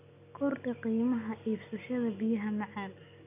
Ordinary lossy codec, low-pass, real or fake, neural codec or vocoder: none; 3.6 kHz; real; none